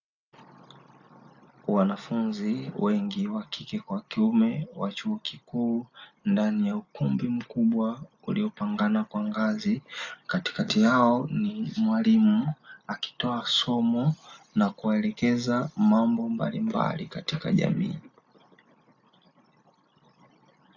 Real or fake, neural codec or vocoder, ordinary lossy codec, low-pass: real; none; AAC, 48 kbps; 7.2 kHz